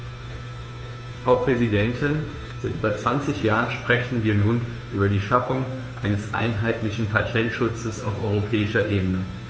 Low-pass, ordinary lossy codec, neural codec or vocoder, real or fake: none; none; codec, 16 kHz, 2 kbps, FunCodec, trained on Chinese and English, 25 frames a second; fake